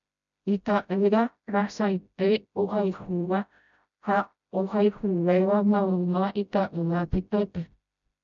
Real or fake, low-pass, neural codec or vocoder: fake; 7.2 kHz; codec, 16 kHz, 0.5 kbps, FreqCodec, smaller model